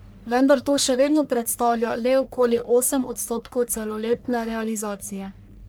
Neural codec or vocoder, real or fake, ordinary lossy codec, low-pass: codec, 44.1 kHz, 1.7 kbps, Pupu-Codec; fake; none; none